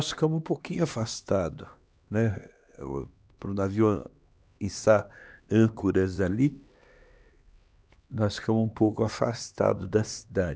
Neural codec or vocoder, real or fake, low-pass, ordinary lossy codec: codec, 16 kHz, 2 kbps, X-Codec, HuBERT features, trained on LibriSpeech; fake; none; none